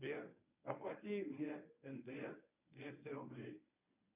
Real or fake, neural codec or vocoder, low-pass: fake; codec, 24 kHz, 0.9 kbps, WavTokenizer, medium speech release version 1; 3.6 kHz